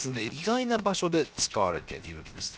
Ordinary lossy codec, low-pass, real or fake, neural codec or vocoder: none; none; fake; codec, 16 kHz, 0.7 kbps, FocalCodec